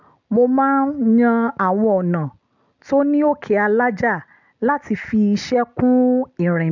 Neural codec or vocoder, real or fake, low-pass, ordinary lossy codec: none; real; 7.2 kHz; none